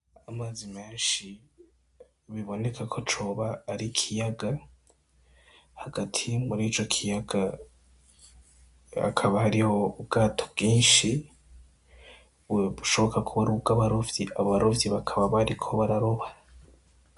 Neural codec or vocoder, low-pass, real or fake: none; 10.8 kHz; real